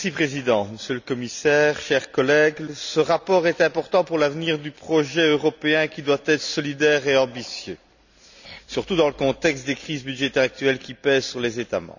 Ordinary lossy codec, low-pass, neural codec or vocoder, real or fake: none; 7.2 kHz; none; real